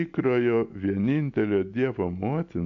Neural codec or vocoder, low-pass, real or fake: none; 7.2 kHz; real